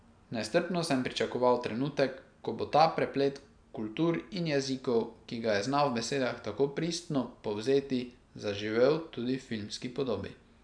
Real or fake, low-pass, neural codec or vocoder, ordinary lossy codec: real; 9.9 kHz; none; none